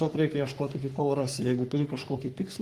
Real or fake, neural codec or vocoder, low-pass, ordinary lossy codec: fake; codec, 44.1 kHz, 3.4 kbps, Pupu-Codec; 14.4 kHz; Opus, 24 kbps